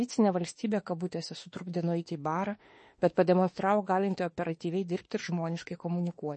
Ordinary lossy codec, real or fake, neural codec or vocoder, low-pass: MP3, 32 kbps; fake; autoencoder, 48 kHz, 32 numbers a frame, DAC-VAE, trained on Japanese speech; 10.8 kHz